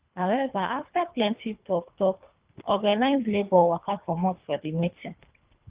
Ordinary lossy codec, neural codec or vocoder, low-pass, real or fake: Opus, 32 kbps; codec, 24 kHz, 3 kbps, HILCodec; 3.6 kHz; fake